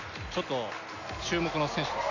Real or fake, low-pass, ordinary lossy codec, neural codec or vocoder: real; 7.2 kHz; none; none